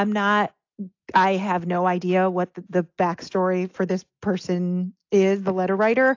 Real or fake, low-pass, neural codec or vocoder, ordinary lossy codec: real; 7.2 kHz; none; AAC, 48 kbps